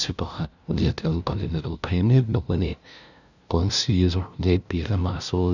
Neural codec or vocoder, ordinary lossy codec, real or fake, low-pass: codec, 16 kHz, 0.5 kbps, FunCodec, trained on LibriTTS, 25 frames a second; none; fake; 7.2 kHz